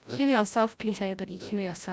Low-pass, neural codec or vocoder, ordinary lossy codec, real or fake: none; codec, 16 kHz, 0.5 kbps, FreqCodec, larger model; none; fake